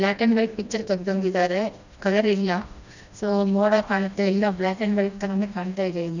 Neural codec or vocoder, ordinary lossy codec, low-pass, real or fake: codec, 16 kHz, 1 kbps, FreqCodec, smaller model; none; 7.2 kHz; fake